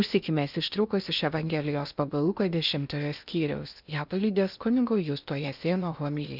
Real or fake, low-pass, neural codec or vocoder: fake; 5.4 kHz; codec, 16 kHz in and 24 kHz out, 0.8 kbps, FocalCodec, streaming, 65536 codes